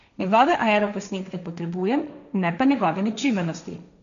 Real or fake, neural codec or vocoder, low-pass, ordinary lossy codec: fake; codec, 16 kHz, 1.1 kbps, Voila-Tokenizer; 7.2 kHz; none